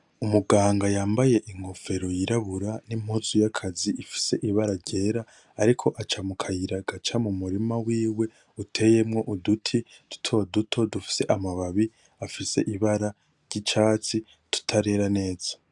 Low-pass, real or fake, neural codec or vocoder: 10.8 kHz; real; none